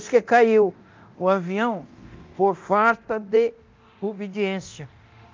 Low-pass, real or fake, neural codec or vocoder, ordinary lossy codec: 7.2 kHz; fake; codec, 24 kHz, 0.9 kbps, DualCodec; Opus, 24 kbps